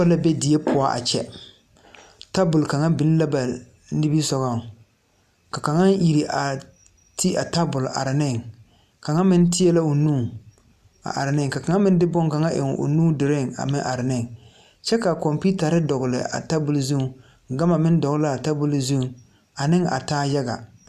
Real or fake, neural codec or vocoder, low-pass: real; none; 14.4 kHz